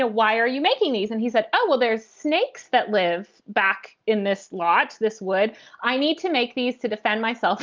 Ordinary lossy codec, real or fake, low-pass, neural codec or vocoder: Opus, 24 kbps; real; 7.2 kHz; none